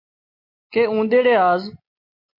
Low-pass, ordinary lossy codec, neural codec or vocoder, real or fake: 5.4 kHz; MP3, 32 kbps; none; real